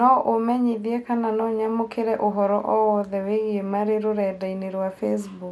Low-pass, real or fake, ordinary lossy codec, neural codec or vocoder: none; real; none; none